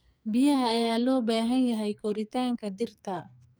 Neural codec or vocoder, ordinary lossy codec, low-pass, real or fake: codec, 44.1 kHz, 2.6 kbps, SNAC; none; none; fake